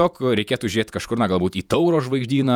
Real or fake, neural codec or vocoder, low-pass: fake; vocoder, 48 kHz, 128 mel bands, Vocos; 19.8 kHz